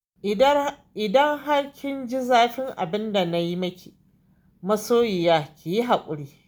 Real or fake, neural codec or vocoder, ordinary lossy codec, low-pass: real; none; none; none